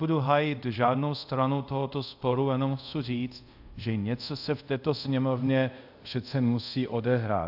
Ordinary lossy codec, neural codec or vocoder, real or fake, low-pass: AAC, 48 kbps; codec, 24 kHz, 0.5 kbps, DualCodec; fake; 5.4 kHz